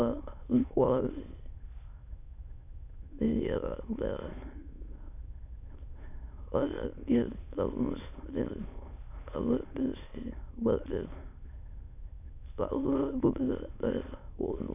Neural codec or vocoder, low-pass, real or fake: autoencoder, 22.05 kHz, a latent of 192 numbers a frame, VITS, trained on many speakers; 3.6 kHz; fake